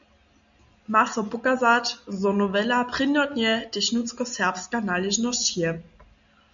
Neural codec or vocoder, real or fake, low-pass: none; real; 7.2 kHz